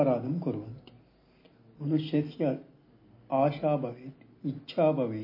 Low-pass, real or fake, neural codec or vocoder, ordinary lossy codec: 5.4 kHz; real; none; MP3, 24 kbps